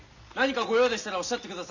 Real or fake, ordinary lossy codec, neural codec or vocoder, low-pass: real; none; none; 7.2 kHz